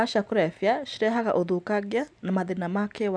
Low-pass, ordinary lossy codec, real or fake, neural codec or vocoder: none; none; real; none